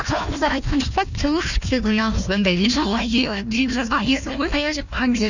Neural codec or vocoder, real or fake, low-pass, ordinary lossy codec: codec, 16 kHz, 1 kbps, FunCodec, trained on Chinese and English, 50 frames a second; fake; 7.2 kHz; none